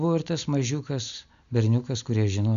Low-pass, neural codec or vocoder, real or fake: 7.2 kHz; none; real